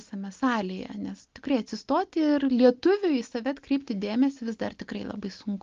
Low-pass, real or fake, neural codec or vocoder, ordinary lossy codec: 7.2 kHz; real; none; Opus, 32 kbps